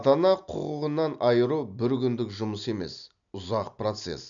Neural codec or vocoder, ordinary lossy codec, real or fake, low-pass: none; none; real; 7.2 kHz